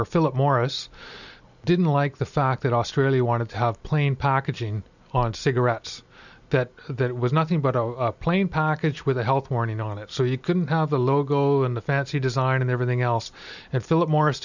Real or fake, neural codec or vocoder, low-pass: real; none; 7.2 kHz